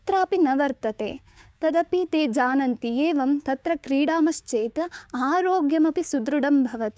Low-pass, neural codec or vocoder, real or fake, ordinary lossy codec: none; codec, 16 kHz, 6 kbps, DAC; fake; none